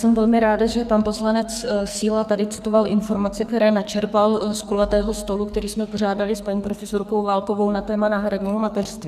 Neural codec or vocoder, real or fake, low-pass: codec, 44.1 kHz, 2.6 kbps, SNAC; fake; 14.4 kHz